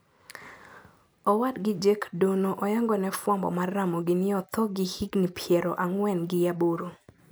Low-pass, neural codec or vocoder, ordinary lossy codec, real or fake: none; none; none; real